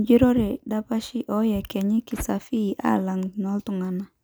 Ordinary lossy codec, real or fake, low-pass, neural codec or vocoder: none; real; none; none